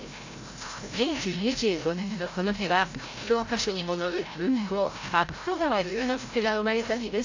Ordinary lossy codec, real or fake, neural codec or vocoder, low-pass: AAC, 48 kbps; fake; codec, 16 kHz, 0.5 kbps, FreqCodec, larger model; 7.2 kHz